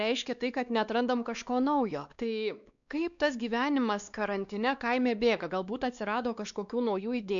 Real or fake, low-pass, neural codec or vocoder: fake; 7.2 kHz; codec, 16 kHz, 2 kbps, X-Codec, WavLM features, trained on Multilingual LibriSpeech